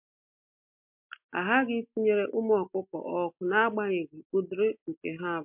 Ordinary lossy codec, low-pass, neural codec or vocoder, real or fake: MP3, 32 kbps; 3.6 kHz; none; real